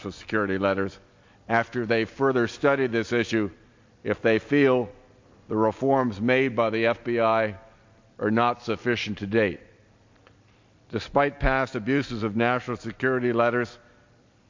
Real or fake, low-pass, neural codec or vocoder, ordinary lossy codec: real; 7.2 kHz; none; MP3, 48 kbps